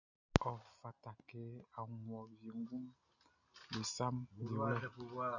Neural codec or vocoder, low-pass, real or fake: none; 7.2 kHz; real